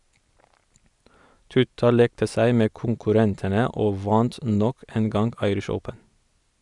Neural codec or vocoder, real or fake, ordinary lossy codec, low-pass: none; real; none; 10.8 kHz